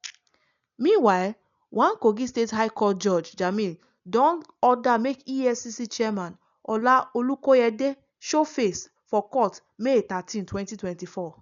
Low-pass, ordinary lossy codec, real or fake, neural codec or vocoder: 7.2 kHz; none; real; none